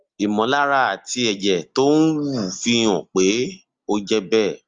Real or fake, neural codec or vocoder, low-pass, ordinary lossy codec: real; none; 7.2 kHz; Opus, 32 kbps